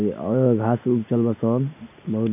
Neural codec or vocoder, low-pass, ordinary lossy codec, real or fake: none; 3.6 kHz; none; real